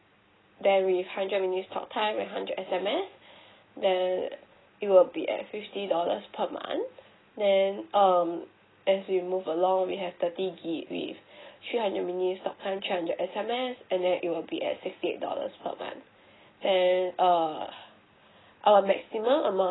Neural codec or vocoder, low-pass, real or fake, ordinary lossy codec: none; 7.2 kHz; real; AAC, 16 kbps